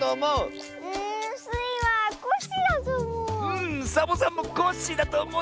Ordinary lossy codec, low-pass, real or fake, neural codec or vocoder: none; none; real; none